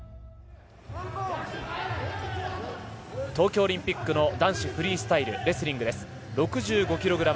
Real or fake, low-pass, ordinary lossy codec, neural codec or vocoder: real; none; none; none